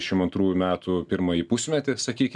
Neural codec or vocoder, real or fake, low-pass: none; real; 10.8 kHz